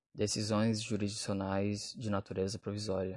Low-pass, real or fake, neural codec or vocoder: 10.8 kHz; real; none